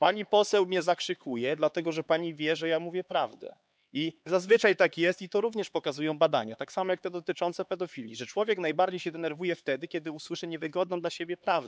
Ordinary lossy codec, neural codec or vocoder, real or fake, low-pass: none; codec, 16 kHz, 4 kbps, X-Codec, HuBERT features, trained on LibriSpeech; fake; none